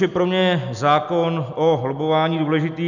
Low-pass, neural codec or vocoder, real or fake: 7.2 kHz; none; real